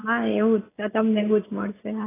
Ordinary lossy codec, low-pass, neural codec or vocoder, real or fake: AAC, 16 kbps; 3.6 kHz; none; real